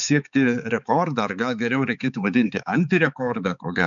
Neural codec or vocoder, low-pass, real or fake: codec, 16 kHz, 4 kbps, X-Codec, HuBERT features, trained on balanced general audio; 7.2 kHz; fake